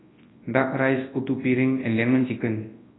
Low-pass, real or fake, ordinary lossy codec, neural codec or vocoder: 7.2 kHz; fake; AAC, 16 kbps; codec, 24 kHz, 0.9 kbps, WavTokenizer, large speech release